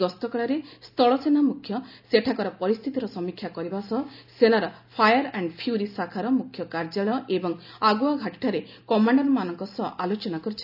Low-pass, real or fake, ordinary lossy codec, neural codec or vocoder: 5.4 kHz; real; none; none